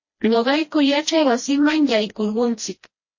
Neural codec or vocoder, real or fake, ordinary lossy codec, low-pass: codec, 16 kHz, 1 kbps, FreqCodec, smaller model; fake; MP3, 32 kbps; 7.2 kHz